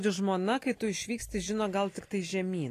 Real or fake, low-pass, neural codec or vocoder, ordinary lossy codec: real; 14.4 kHz; none; AAC, 48 kbps